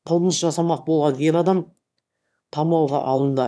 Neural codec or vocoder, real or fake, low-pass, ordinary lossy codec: autoencoder, 22.05 kHz, a latent of 192 numbers a frame, VITS, trained on one speaker; fake; none; none